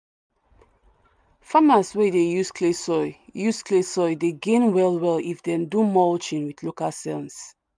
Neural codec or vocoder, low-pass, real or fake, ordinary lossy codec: none; none; real; none